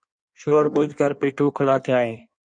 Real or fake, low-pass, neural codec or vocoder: fake; 9.9 kHz; codec, 16 kHz in and 24 kHz out, 1.1 kbps, FireRedTTS-2 codec